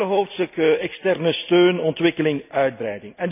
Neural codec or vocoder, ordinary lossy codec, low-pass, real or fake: none; none; 3.6 kHz; real